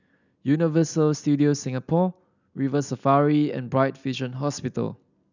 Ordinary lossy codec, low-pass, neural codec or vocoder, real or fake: none; 7.2 kHz; none; real